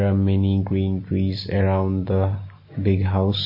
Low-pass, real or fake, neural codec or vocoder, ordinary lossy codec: 5.4 kHz; real; none; MP3, 24 kbps